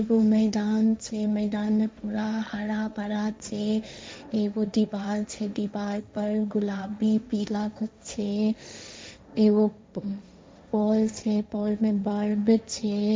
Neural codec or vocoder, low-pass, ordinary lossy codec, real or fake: codec, 16 kHz, 1.1 kbps, Voila-Tokenizer; none; none; fake